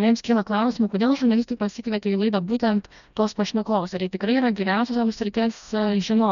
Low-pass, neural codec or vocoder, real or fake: 7.2 kHz; codec, 16 kHz, 1 kbps, FreqCodec, smaller model; fake